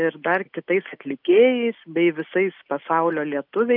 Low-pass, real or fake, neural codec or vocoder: 5.4 kHz; real; none